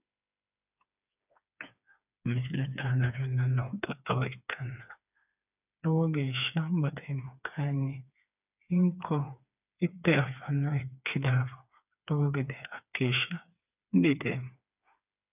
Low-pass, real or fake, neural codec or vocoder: 3.6 kHz; fake; codec, 16 kHz, 4 kbps, FreqCodec, smaller model